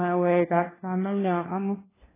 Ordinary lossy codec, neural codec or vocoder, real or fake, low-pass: AAC, 16 kbps; codec, 16 kHz, 1 kbps, FunCodec, trained on LibriTTS, 50 frames a second; fake; 3.6 kHz